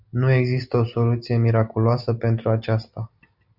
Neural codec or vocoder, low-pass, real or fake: none; 5.4 kHz; real